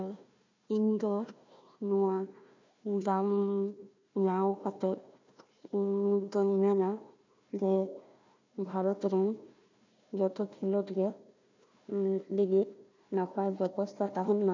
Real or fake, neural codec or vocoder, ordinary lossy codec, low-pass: fake; codec, 16 kHz, 1 kbps, FunCodec, trained on Chinese and English, 50 frames a second; MP3, 64 kbps; 7.2 kHz